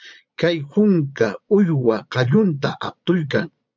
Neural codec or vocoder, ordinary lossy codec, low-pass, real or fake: vocoder, 22.05 kHz, 80 mel bands, Vocos; MP3, 64 kbps; 7.2 kHz; fake